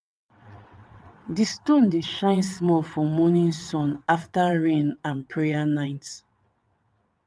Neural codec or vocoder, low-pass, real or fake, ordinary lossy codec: vocoder, 22.05 kHz, 80 mel bands, WaveNeXt; none; fake; none